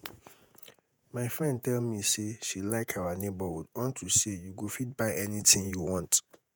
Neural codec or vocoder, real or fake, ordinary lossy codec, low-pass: vocoder, 48 kHz, 128 mel bands, Vocos; fake; none; none